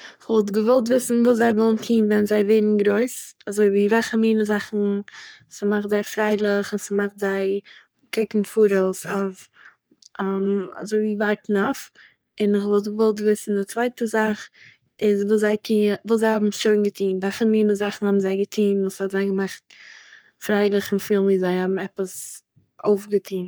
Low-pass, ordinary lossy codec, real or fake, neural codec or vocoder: none; none; fake; codec, 44.1 kHz, 3.4 kbps, Pupu-Codec